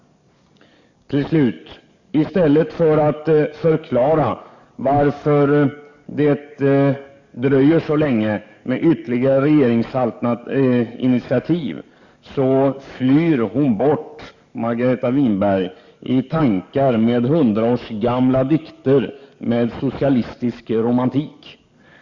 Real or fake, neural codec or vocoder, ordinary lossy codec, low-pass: fake; codec, 44.1 kHz, 7.8 kbps, DAC; Opus, 64 kbps; 7.2 kHz